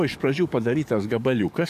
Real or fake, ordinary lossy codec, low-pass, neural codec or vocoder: fake; AAC, 96 kbps; 14.4 kHz; codec, 44.1 kHz, 7.8 kbps, DAC